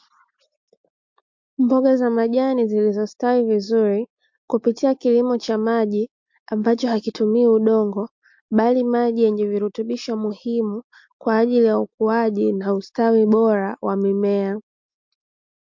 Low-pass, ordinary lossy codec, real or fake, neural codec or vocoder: 7.2 kHz; MP3, 48 kbps; real; none